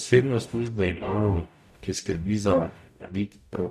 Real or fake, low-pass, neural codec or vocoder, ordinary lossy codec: fake; 14.4 kHz; codec, 44.1 kHz, 0.9 kbps, DAC; none